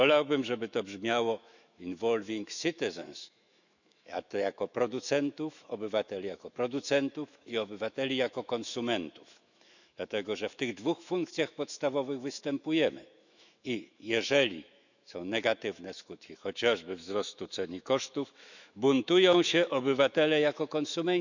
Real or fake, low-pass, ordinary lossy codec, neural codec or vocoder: fake; 7.2 kHz; none; autoencoder, 48 kHz, 128 numbers a frame, DAC-VAE, trained on Japanese speech